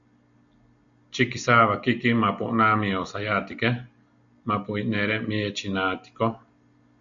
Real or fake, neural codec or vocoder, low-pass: real; none; 7.2 kHz